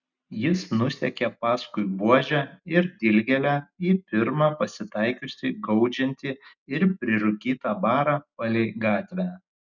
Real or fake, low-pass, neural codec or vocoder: real; 7.2 kHz; none